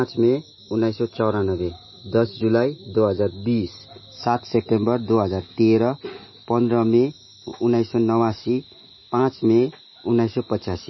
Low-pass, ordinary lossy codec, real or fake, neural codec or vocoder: 7.2 kHz; MP3, 24 kbps; real; none